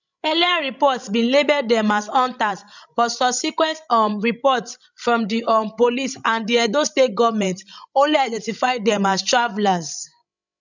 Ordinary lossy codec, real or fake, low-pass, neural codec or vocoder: none; fake; 7.2 kHz; codec, 16 kHz, 16 kbps, FreqCodec, larger model